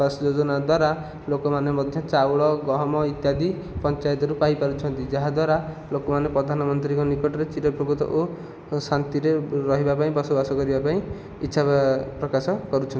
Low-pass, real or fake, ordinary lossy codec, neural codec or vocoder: none; real; none; none